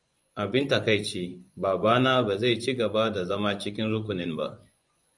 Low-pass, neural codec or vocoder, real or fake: 10.8 kHz; vocoder, 24 kHz, 100 mel bands, Vocos; fake